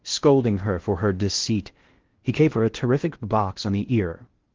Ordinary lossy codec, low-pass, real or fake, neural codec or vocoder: Opus, 16 kbps; 7.2 kHz; fake; codec, 16 kHz in and 24 kHz out, 0.6 kbps, FocalCodec, streaming, 4096 codes